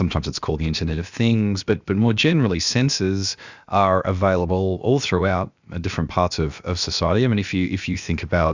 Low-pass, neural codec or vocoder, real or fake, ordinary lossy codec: 7.2 kHz; codec, 16 kHz, about 1 kbps, DyCAST, with the encoder's durations; fake; Opus, 64 kbps